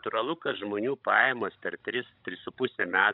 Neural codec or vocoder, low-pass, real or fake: codec, 16 kHz, 16 kbps, FreqCodec, larger model; 5.4 kHz; fake